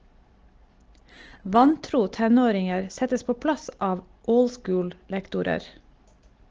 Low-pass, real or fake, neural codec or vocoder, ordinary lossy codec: 7.2 kHz; real; none; Opus, 16 kbps